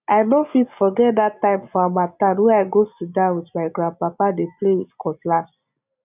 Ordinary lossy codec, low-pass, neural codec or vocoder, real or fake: none; 3.6 kHz; none; real